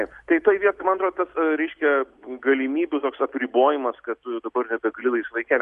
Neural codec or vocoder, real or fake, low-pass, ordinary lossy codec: none; real; 10.8 kHz; Opus, 64 kbps